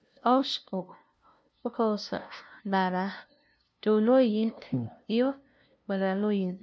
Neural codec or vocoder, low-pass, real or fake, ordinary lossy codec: codec, 16 kHz, 0.5 kbps, FunCodec, trained on LibriTTS, 25 frames a second; none; fake; none